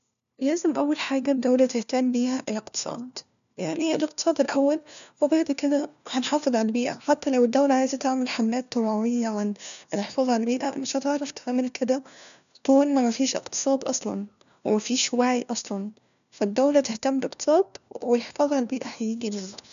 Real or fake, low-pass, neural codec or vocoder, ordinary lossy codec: fake; 7.2 kHz; codec, 16 kHz, 1 kbps, FunCodec, trained on LibriTTS, 50 frames a second; none